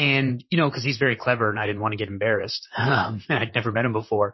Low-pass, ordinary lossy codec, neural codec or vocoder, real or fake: 7.2 kHz; MP3, 24 kbps; vocoder, 44.1 kHz, 128 mel bands, Pupu-Vocoder; fake